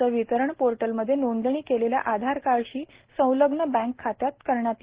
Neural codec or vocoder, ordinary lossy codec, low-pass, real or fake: none; Opus, 16 kbps; 3.6 kHz; real